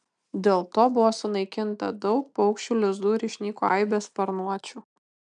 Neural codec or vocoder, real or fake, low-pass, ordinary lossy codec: none; real; 9.9 kHz; MP3, 96 kbps